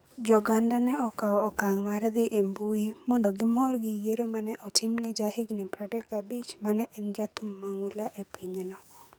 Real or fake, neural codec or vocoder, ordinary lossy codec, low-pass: fake; codec, 44.1 kHz, 2.6 kbps, SNAC; none; none